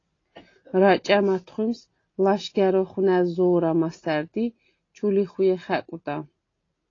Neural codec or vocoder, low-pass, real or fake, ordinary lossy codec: none; 7.2 kHz; real; AAC, 32 kbps